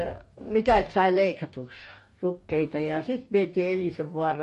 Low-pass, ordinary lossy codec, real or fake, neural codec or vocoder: 14.4 kHz; MP3, 48 kbps; fake; codec, 44.1 kHz, 2.6 kbps, DAC